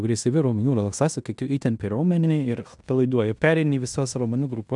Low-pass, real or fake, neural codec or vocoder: 10.8 kHz; fake; codec, 16 kHz in and 24 kHz out, 0.9 kbps, LongCat-Audio-Codec, fine tuned four codebook decoder